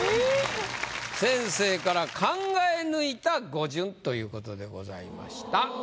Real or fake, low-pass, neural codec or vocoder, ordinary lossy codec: real; none; none; none